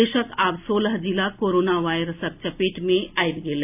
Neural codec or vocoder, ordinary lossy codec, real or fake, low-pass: none; AAC, 32 kbps; real; 3.6 kHz